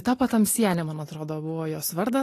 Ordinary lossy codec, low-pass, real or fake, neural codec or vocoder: AAC, 48 kbps; 14.4 kHz; real; none